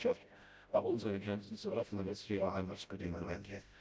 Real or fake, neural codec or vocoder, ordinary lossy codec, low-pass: fake; codec, 16 kHz, 0.5 kbps, FreqCodec, smaller model; none; none